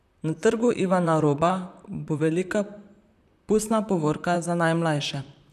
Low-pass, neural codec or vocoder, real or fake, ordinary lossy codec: 14.4 kHz; vocoder, 44.1 kHz, 128 mel bands, Pupu-Vocoder; fake; none